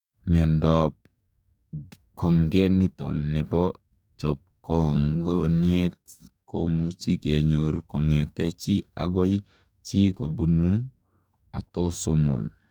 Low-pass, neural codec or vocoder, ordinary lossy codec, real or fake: 19.8 kHz; codec, 44.1 kHz, 2.6 kbps, DAC; none; fake